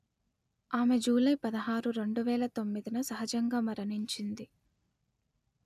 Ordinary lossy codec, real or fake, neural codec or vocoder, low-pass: none; real; none; 14.4 kHz